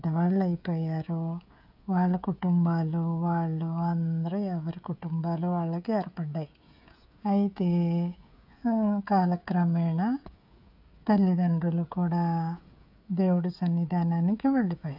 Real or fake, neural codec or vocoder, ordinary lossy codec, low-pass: fake; codec, 16 kHz, 16 kbps, FreqCodec, smaller model; none; 5.4 kHz